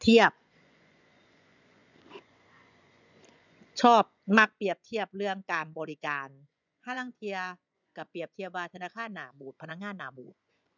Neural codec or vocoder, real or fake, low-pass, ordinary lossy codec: vocoder, 24 kHz, 100 mel bands, Vocos; fake; 7.2 kHz; none